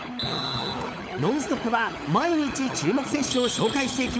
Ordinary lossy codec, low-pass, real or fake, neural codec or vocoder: none; none; fake; codec, 16 kHz, 16 kbps, FunCodec, trained on LibriTTS, 50 frames a second